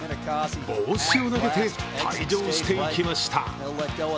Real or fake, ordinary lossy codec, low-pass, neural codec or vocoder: real; none; none; none